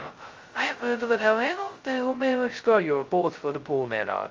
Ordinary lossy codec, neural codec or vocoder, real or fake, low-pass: Opus, 32 kbps; codec, 16 kHz, 0.2 kbps, FocalCodec; fake; 7.2 kHz